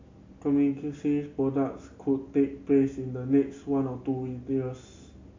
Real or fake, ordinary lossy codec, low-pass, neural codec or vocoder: real; MP3, 64 kbps; 7.2 kHz; none